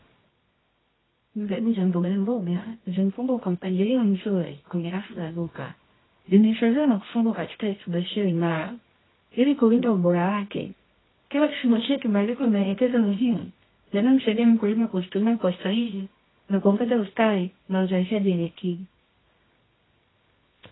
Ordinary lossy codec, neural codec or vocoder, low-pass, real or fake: AAC, 16 kbps; codec, 24 kHz, 0.9 kbps, WavTokenizer, medium music audio release; 7.2 kHz; fake